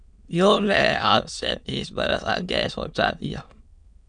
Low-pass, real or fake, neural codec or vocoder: 9.9 kHz; fake; autoencoder, 22.05 kHz, a latent of 192 numbers a frame, VITS, trained on many speakers